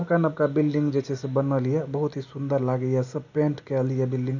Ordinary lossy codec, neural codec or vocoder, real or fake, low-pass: none; none; real; 7.2 kHz